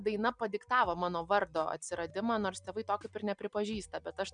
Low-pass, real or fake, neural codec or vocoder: 9.9 kHz; real; none